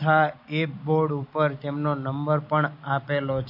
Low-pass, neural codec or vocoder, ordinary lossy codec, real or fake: 5.4 kHz; none; MP3, 32 kbps; real